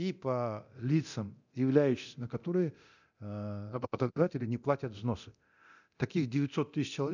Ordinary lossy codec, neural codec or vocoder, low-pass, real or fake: none; codec, 24 kHz, 0.9 kbps, DualCodec; 7.2 kHz; fake